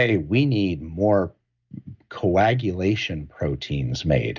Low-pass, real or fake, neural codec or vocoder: 7.2 kHz; real; none